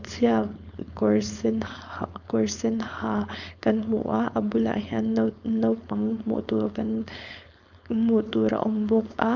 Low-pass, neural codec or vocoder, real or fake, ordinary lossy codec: 7.2 kHz; codec, 16 kHz, 4.8 kbps, FACodec; fake; none